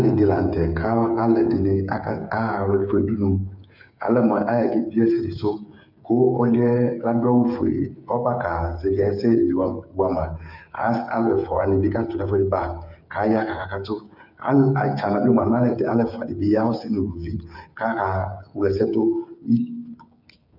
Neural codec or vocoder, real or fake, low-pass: codec, 16 kHz, 8 kbps, FreqCodec, smaller model; fake; 5.4 kHz